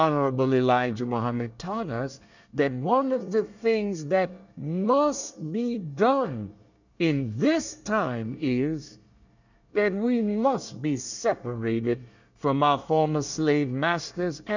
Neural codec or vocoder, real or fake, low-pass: codec, 24 kHz, 1 kbps, SNAC; fake; 7.2 kHz